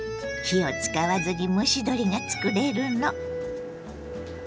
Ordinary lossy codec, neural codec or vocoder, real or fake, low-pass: none; none; real; none